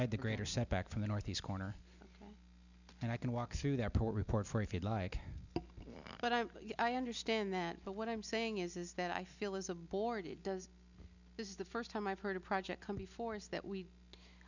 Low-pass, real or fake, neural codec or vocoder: 7.2 kHz; real; none